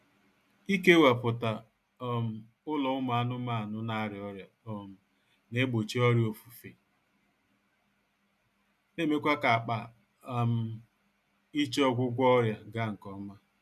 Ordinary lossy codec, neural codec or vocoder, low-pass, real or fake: none; none; 14.4 kHz; real